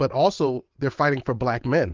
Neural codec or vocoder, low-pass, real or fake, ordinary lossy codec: vocoder, 22.05 kHz, 80 mel bands, WaveNeXt; 7.2 kHz; fake; Opus, 32 kbps